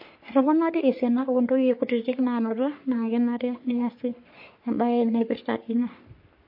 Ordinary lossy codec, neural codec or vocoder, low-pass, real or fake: MP3, 32 kbps; codec, 44.1 kHz, 3.4 kbps, Pupu-Codec; 5.4 kHz; fake